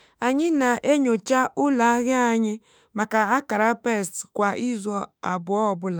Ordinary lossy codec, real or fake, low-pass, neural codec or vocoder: none; fake; none; autoencoder, 48 kHz, 32 numbers a frame, DAC-VAE, trained on Japanese speech